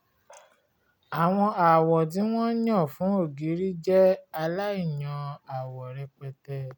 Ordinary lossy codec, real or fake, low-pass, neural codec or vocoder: none; real; 19.8 kHz; none